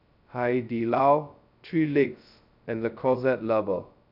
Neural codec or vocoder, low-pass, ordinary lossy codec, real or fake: codec, 16 kHz, 0.2 kbps, FocalCodec; 5.4 kHz; none; fake